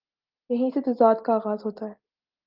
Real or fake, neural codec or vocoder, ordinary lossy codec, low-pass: real; none; Opus, 24 kbps; 5.4 kHz